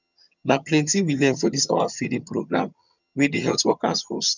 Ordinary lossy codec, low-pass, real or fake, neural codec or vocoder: none; 7.2 kHz; fake; vocoder, 22.05 kHz, 80 mel bands, HiFi-GAN